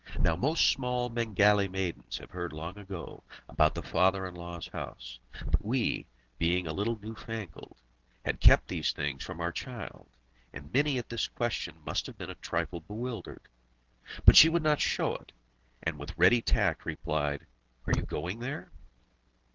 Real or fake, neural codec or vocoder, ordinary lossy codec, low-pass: real; none; Opus, 16 kbps; 7.2 kHz